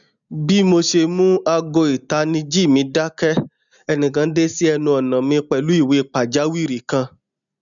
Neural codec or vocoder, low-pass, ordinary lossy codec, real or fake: none; 7.2 kHz; none; real